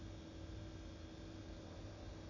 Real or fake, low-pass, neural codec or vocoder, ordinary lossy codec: real; 7.2 kHz; none; none